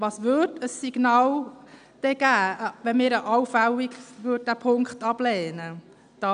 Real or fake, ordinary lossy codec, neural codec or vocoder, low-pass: real; none; none; 9.9 kHz